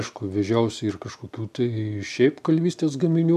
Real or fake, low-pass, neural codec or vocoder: real; 14.4 kHz; none